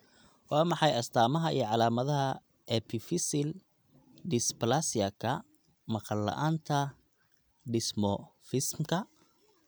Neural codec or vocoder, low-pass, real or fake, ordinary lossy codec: none; none; real; none